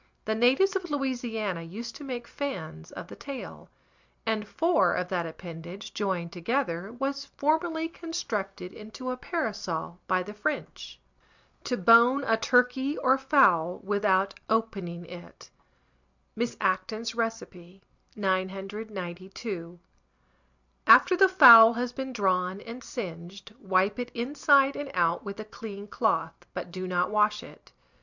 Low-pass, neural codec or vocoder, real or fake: 7.2 kHz; none; real